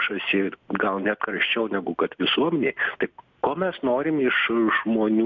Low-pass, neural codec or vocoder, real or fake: 7.2 kHz; none; real